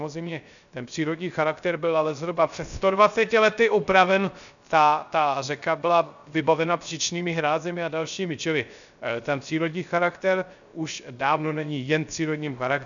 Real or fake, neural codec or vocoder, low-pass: fake; codec, 16 kHz, 0.3 kbps, FocalCodec; 7.2 kHz